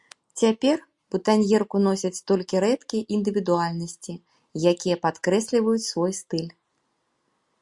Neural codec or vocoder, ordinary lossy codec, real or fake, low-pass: none; Opus, 64 kbps; real; 10.8 kHz